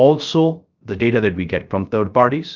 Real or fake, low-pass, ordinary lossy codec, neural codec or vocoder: fake; 7.2 kHz; Opus, 24 kbps; codec, 16 kHz, 0.3 kbps, FocalCodec